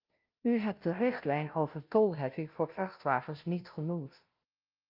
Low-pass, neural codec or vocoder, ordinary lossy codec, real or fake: 5.4 kHz; codec, 16 kHz, 0.5 kbps, FunCodec, trained on Chinese and English, 25 frames a second; Opus, 24 kbps; fake